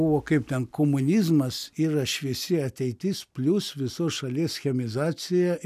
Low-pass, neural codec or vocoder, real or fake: 14.4 kHz; none; real